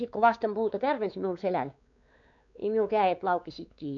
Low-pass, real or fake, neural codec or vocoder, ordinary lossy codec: 7.2 kHz; fake; codec, 16 kHz, 4 kbps, X-Codec, WavLM features, trained on Multilingual LibriSpeech; none